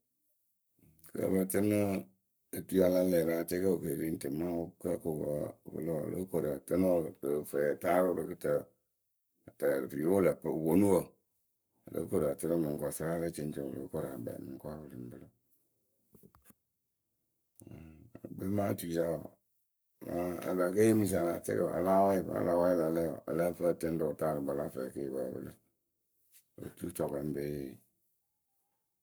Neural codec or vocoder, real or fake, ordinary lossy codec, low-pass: codec, 44.1 kHz, 7.8 kbps, Pupu-Codec; fake; none; none